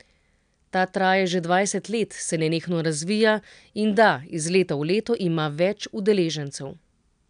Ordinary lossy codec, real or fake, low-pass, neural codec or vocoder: none; real; 9.9 kHz; none